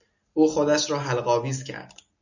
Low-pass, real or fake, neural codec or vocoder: 7.2 kHz; real; none